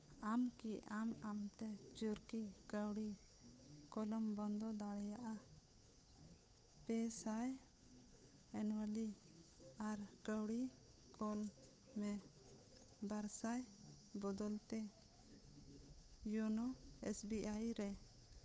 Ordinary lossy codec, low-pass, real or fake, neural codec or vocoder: none; none; fake; codec, 16 kHz, 8 kbps, FunCodec, trained on Chinese and English, 25 frames a second